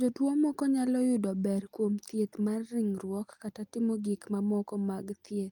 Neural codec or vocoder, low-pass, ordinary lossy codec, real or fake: none; 19.8 kHz; Opus, 32 kbps; real